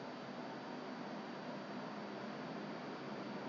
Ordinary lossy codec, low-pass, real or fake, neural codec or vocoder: MP3, 64 kbps; 7.2 kHz; real; none